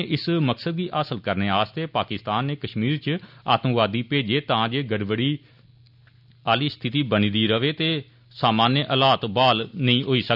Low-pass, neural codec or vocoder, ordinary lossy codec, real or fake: 5.4 kHz; none; none; real